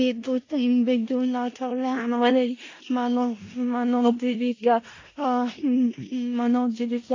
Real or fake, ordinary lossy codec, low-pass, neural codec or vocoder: fake; AAC, 32 kbps; 7.2 kHz; codec, 16 kHz in and 24 kHz out, 0.4 kbps, LongCat-Audio-Codec, four codebook decoder